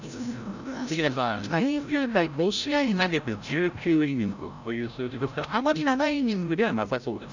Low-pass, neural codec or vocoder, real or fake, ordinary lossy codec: 7.2 kHz; codec, 16 kHz, 0.5 kbps, FreqCodec, larger model; fake; none